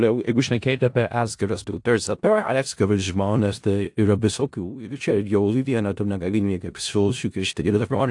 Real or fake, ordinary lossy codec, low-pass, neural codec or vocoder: fake; AAC, 64 kbps; 10.8 kHz; codec, 16 kHz in and 24 kHz out, 0.4 kbps, LongCat-Audio-Codec, four codebook decoder